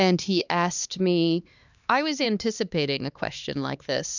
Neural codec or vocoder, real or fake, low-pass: codec, 16 kHz, 2 kbps, X-Codec, HuBERT features, trained on LibriSpeech; fake; 7.2 kHz